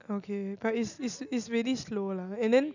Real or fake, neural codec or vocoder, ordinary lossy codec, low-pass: real; none; none; 7.2 kHz